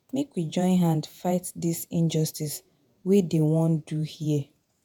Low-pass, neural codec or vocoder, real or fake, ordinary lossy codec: none; vocoder, 48 kHz, 128 mel bands, Vocos; fake; none